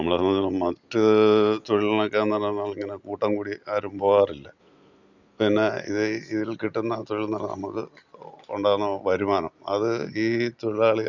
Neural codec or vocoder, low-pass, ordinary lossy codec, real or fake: none; 7.2 kHz; Opus, 64 kbps; real